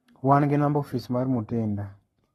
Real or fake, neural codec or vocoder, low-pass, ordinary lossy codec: fake; codec, 44.1 kHz, 7.8 kbps, Pupu-Codec; 19.8 kHz; AAC, 32 kbps